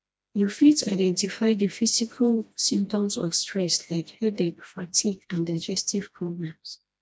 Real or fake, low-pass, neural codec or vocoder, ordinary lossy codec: fake; none; codec, 16 kHz, 1 kbps, FreqCodec, smaller model; none